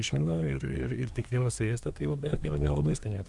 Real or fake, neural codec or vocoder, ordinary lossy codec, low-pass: fake; codec, 24 kHz, 1 kbps, SNAC; Opus, 64 kbps; 10.8 kHz